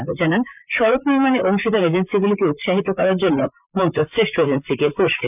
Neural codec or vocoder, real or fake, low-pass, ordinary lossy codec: vocoder, 44.1 kHz, 128 mel bands, Pupu-Vocoder; fake; 3.6 kHz; none